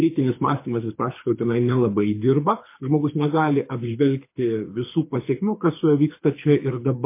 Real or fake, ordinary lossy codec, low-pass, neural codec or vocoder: fake; MP3, 24 kbps; 3.6 kHz; codec, 24 kHz, 6 kbps, HILCodec